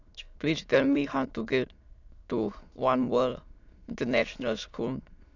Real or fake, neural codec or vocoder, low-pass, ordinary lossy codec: fake; autoencoder, 22.05 kHz, a latent of 192 numbers a frame, VITS, trained on many speakers; 7.2 kHz; none